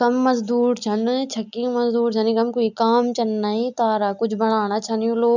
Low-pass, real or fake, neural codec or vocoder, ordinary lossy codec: 7.2 kHz; real; none; none